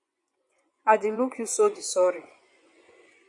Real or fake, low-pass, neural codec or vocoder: fake; 10.8 kHz; vocoder, 24 kHz, 100 mel bands, Vocos